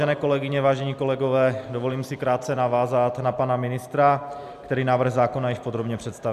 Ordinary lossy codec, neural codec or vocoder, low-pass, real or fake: AAC, 96 kbps; none; 14.4 kHz; real